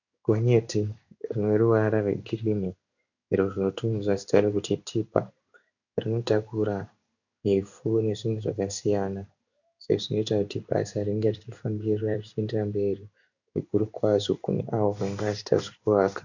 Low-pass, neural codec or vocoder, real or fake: 7.2 kHz; codec, 16 kHz in and 24 kHz out, 1 kbps, XY-Tokenizer; fake